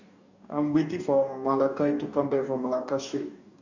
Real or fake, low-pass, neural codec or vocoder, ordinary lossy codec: fake; 7.2 kHz; codec, 44.1 kHz, 2.6 kbps, DAC; none